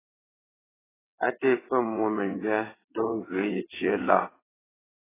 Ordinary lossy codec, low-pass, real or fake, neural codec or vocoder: AAC, 16 kbps; 3.6 kHz; fake; vocoder, 22.05 kHz, 80 mel bands, WaveNeXt